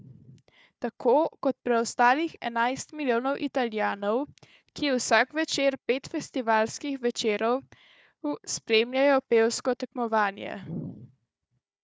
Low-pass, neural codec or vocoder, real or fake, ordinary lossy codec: none; codec, 16 kHz, 4 kbps, FunCodec, trained on Chinese and English, 50 frames a second; fake; none